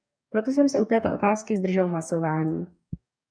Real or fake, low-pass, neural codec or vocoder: fake; 9.9 kHz; codec, 44.1 kHz, 2.6 kbps, DAC